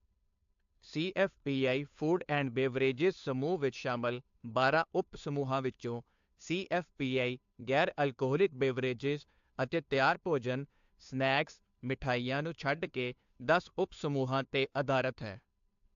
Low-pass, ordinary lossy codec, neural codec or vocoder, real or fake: 7.2 kHz; AAC, 64 kbps; codec, 16 kHz, 2 kbps, FunCodec, trained on Chinese and English, 25 frames a second; fake